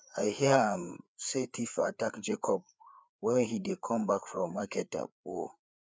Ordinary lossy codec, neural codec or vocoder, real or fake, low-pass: none; codec, 16 kHz, 4 kbps, FreqCodec, larger model; fake; none